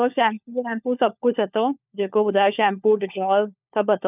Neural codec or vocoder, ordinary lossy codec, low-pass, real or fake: codec, 16 kHz, 4 kbps, FunCodec, trained on LibriTTS, 50 frames a second; none; 3.6 kHz; fake